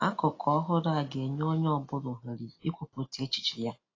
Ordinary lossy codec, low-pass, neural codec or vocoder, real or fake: AAC, 32 kbps; 7.2 kHz; none; real